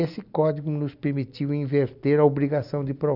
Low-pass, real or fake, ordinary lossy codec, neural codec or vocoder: 5.4 kHz; real; none; none